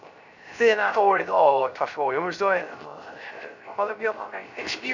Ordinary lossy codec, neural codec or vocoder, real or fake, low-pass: none; codec, 16 kHz, 0.3 kbps, FocalCodec; fake; 7.2 kHz